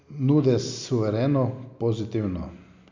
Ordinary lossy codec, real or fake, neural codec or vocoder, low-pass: MP3, 64 kbps; real; none; 7.2 kHz